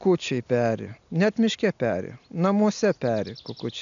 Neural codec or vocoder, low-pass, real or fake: none; 7.2 kHz; real